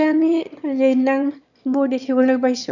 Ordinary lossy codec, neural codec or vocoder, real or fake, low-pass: none; autoencoder, 22.05 kHz, a latent of 192 numbers a frame, VITS, trained on one speaker; fake; 7.2 kHz